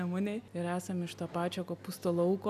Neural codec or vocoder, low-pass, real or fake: none; 14.4 kHz; real